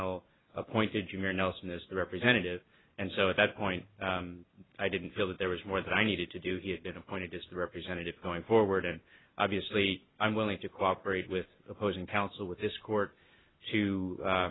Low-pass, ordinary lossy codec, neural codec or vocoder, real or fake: 7.2 kHz; AAC, 16 kbps; none; real